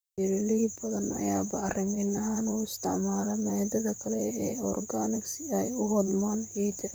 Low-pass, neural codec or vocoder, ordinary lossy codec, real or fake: none; vocoder, 44.1 kHz, 128 mel bands, Pupu-Vocoder; none; fake